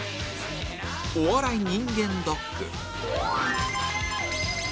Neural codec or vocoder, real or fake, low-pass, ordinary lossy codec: none; real; none; none